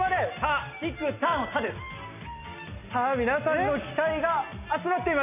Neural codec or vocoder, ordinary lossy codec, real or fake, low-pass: none; none; real; 3.6 kHz